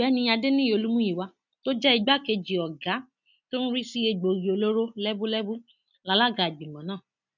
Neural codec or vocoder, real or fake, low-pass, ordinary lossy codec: none; real; 7.2 kHz; none